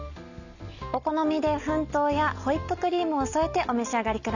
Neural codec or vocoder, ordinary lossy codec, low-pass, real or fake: none; none; 7.2 kHz; real